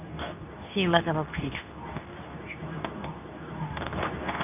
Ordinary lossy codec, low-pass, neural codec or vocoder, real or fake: none; 3.6 kHz; codec, 24 kHz, 0.9 kbps, WavTokenizer, medium speech release version 2; fake